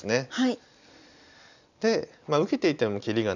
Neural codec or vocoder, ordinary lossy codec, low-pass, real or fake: none; none; 7.2 kHz; real